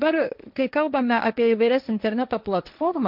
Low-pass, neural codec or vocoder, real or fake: 5.4 kHz; codec, 16 kHz, 1.1 kbps, Voila-Tokenizer; fake